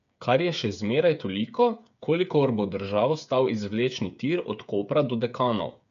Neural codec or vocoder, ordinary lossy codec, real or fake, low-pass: codec, 16 kHz, 8 kbps, FreqCodec, smaller model; AAC, 96 kbps; fake; 7.2 kHz